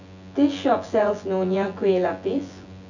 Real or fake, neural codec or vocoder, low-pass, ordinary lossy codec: fake; vocoder, 24 kHz, 100 mel bands, Vocos; 7.2 kHz; none